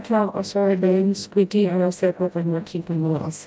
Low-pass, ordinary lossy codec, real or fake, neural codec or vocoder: none; none; fake; codec, 16 kHz, 0.5 kbps, FreqCodec, smaller model